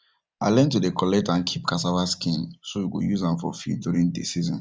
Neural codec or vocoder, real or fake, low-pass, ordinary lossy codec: none; real; none; none